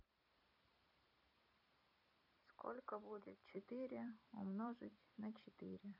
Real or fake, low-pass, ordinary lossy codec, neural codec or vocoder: fake; 5.4 kHz; none; vocoder, 44.1 kHz, 128 mel bands every 256 samples, BigVGAN v2